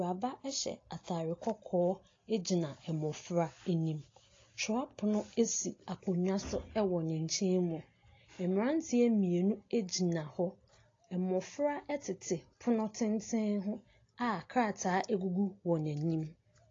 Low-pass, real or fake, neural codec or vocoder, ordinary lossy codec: 7.2 kHz; real; none; AAC, 32 kbps